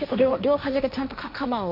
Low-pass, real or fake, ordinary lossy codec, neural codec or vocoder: 5.4 kHz; fake; none; codec, 16 kHz, 1.1 kbps, Voila-Tokenizer